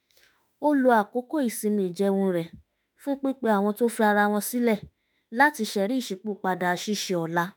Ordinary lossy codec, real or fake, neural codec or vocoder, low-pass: none; fake; autoencoder, 48 kHz, 32 numbers a frame, DAC-VAE, trained on Japanese speech; none